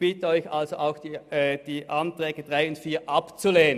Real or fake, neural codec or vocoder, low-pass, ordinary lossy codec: real; none; 14.4 kHz; none